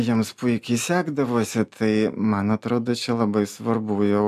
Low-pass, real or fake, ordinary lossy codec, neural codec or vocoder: 14.4 kHz; real; MP3, 96 kbps; none